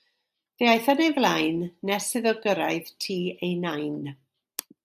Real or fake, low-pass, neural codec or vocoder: real; 14.4 kHz; none